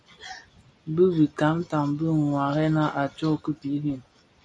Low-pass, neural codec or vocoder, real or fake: 9.9 kHz; none; real